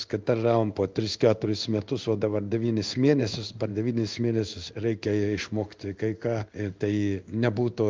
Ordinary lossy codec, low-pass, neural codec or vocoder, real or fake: Opus, 24 kbps; 7.2 kHz; codec, 16 kHz in and 24 kHz out, 1 kbps, XY-Tokenizer; fake